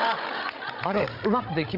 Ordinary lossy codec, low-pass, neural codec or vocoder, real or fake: none; 5.4 kHz; codec, 16 kHz, 16 kbps, FreqCodec, larger model; fake